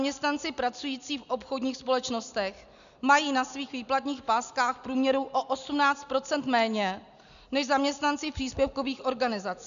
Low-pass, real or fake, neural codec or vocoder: 7.2 kHz; real; none